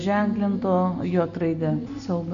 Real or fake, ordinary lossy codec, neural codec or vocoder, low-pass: real; Opus, 64 kbps; none; 7.2 kHz